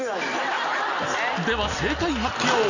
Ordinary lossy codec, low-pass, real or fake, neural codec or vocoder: none; 7.2 kHz; real; none